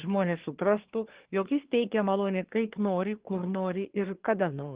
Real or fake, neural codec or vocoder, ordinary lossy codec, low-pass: fake; codec, 24 kHz, 1 kbps, SNAC; Opus, 16 kbps; 3.6 kHz